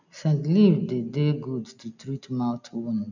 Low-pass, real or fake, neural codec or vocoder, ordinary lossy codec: 7.2 kHz; real; none; none